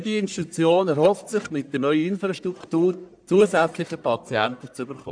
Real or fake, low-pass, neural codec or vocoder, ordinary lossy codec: fake; 9.9 kHz; codec, 44.1 kHz, 1.7 kbps, Pupu-Codec; none